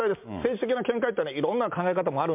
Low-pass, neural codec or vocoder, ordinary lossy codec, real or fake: 3.6 kHz; none; MP3, 32 kbps; real